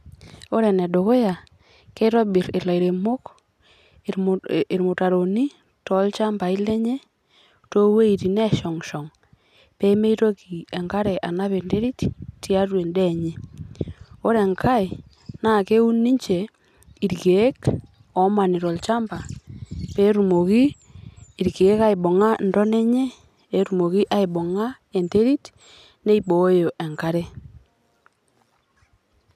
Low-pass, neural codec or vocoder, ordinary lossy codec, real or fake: 14.4 kHz; none; none; real